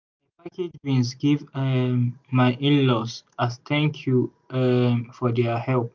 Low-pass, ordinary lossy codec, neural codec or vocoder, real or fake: 7.2 kHz; MP3, 64 kbps; none; real